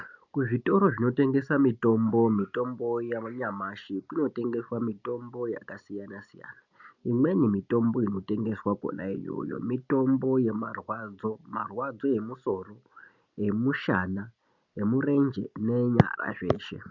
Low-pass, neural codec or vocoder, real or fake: 7.2 kHz; none; real